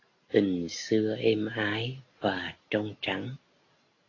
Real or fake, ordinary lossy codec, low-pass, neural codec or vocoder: real; AAC, 32 kbps; 7.2 kHz; none